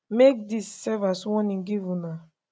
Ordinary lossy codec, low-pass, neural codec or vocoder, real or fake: none; none; none; real